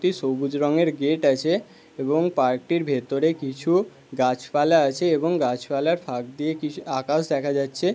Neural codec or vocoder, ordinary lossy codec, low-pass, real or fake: none; none; none; real